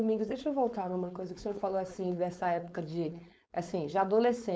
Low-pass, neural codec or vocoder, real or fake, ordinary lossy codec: none; codec, 16 kHz, 4.8 kbps, FACodec; fake; none